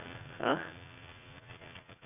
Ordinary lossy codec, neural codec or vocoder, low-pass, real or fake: none; vocoder, 44.1 kHz, 80 mel bands, Vocos; 3.6 kHz; fake